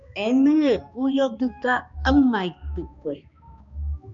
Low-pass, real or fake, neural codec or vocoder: 7.2 kHz; fake; codec, 16 kHz, 2 kbps, X-Codec, HuBERT features, trained on balanced general audio